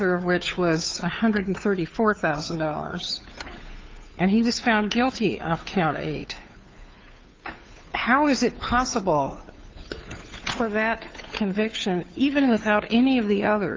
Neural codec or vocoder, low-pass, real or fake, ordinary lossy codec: codec, 16 kHz, 2 kbps, FunCodec, trained on Chinese and English, 25 frames a second; 7.2 kHz; fake; Opus, 24 kbps